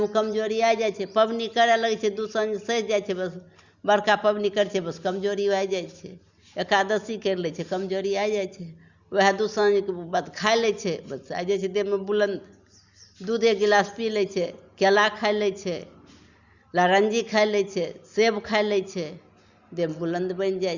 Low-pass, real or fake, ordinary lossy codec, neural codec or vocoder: 7.2 kHz; real; Opus, 64 kbps; none